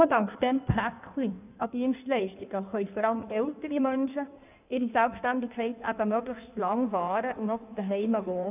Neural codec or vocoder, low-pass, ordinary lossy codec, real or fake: codec, 16 kHz in and 24 kHz out, 1.1 kbps, FireRedTTS-2 codec; 3.6 kHz; none; fake